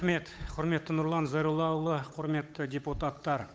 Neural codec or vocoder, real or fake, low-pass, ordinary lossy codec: codec, 16 kHz, 8 kbps, FunCodec, trained on Chinese and English, 25 frames a second; fake; none; none